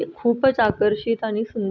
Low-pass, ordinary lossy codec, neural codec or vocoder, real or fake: none; none; none; real